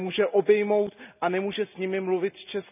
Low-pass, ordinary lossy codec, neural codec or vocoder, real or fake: 3.6 kHz; none; none; real